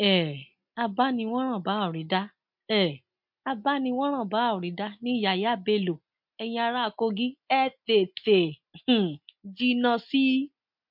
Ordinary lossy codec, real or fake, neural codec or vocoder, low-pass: MP3, 48 kbps; real; none; 5.4 kHz